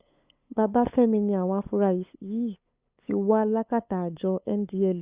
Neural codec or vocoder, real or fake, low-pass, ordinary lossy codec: codec, 16 kHz, 8 kbps, FunCodec, trained on LibriTTS, 25 frames a second; fake; 3.6 kHz; Opus, 64 kbps